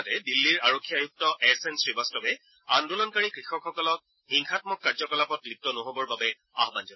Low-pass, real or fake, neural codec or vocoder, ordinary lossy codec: 7.2 kHz; real; none; MP3, 24 kbps